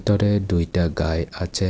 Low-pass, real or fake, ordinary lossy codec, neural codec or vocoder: none; real; none; none